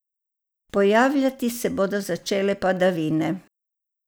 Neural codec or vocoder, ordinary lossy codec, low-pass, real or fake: none; none; none; real